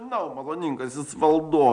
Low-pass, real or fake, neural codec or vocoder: 9.9 kHz; real; none